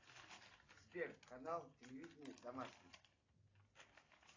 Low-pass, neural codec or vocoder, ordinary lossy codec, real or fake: 7.2 kHz; none; AAC, 32 kbps; real